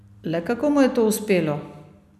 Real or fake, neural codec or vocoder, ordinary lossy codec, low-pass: real; none; none; 14.4 kHz